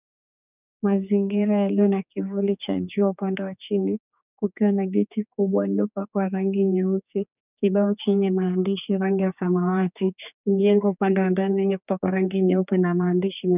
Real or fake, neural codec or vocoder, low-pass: fake; codec, 16 kHz, 4 kbps, X-Codec, HuBERT features, trained on general audio; 3.6 kHz